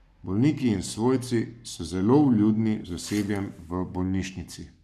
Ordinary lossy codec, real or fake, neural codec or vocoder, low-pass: none; fake; codec, 44.1 kHz, 7.8 kbps, Pupu-Codec; 14.4 kHz